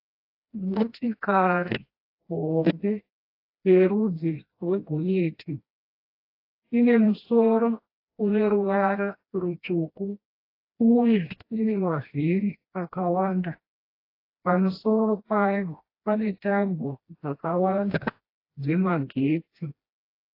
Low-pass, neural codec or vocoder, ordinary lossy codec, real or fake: 5.4 kHz; codec, 16 kHz, 1 kbps, FreqCodec, smaller model; AAC, 32 kbps; fake